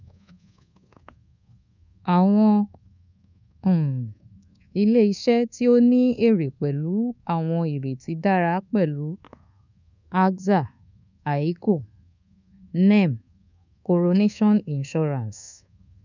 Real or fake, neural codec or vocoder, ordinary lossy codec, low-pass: fake; codec, 24 kHz, 1.2 kbps, DualCodec; none; 7.2 kHz